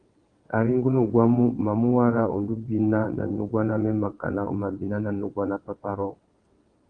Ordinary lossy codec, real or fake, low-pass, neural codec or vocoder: Opus, 24 kbps; fake; 9.9 kHz; vocoder, 22.05 kHz, 80 mel bands, WaveNeXt